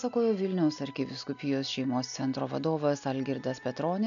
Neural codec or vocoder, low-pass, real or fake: none; 7.2 kHz; real